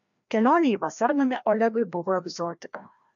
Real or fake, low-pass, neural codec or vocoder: fake; 7.2 kHz; codec, 16 kHz, 1 kbps, FreqCodec, larger model